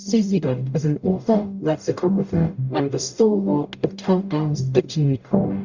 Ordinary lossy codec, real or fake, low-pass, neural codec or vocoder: Opus, 64 kbps; fake; 7.2 kHz; codec, 44.1 kHz, 0.9 kbps, DAC